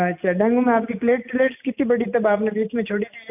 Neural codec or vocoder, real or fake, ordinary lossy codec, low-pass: none; real; none; 3.6 kHz